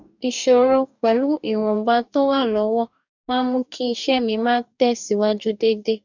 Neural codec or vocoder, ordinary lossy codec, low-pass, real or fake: codec, 44.1 kHz, 2.6 kbps, DAC; none; 7.2 kHz; fake